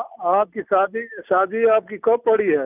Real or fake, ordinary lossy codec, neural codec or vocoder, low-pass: real; none; none; 3.6 kHz